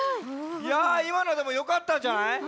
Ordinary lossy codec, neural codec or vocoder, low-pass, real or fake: none; none; none; real